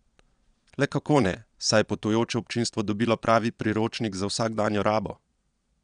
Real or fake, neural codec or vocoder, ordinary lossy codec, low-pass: fake; vocoder, 22.05 kHz, 80 mel bands, Vocos; none; 9.9 kHz